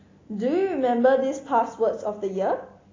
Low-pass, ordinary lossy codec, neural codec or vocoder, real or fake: 7.2 kHz; AAC, 32 kbps; none; real